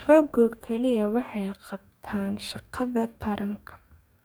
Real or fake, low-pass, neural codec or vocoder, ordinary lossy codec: fake; none; codec, 44.1 kHz, 2.6 kbps, DAC; none